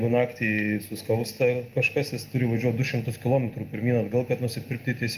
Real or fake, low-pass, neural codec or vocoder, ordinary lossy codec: real; 14.4 kHz; none; Opus, 32 kbps